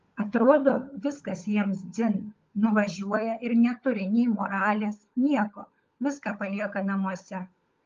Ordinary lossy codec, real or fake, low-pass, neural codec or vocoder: Opus, 32 kbps; fake; 7.2 kHz; codec, 16 kHz, 16 kbps, FunCodec, trained on LibriTTS, 50 frames a second